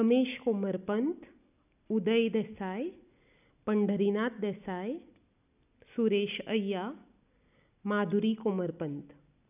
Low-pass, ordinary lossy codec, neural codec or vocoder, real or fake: 3.6 kHz; none; none; real